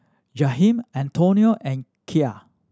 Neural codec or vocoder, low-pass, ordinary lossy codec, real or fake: none; none; none; real